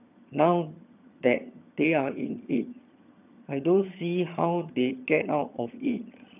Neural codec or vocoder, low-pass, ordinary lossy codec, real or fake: vocoder, 22.05 kHz, 80 mel bands, HiFi-GAN; 3.6 kHz; none; fake